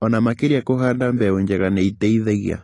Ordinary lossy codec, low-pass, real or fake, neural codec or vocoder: AAC, 32 kbps; 10.8 kHz; real; none